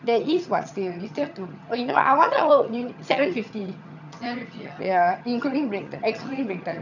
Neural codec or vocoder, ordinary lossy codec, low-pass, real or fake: vocoder, 22.05 kHz, 80 mel bands, HiFi-GAN; none; 7.2 kHz; fake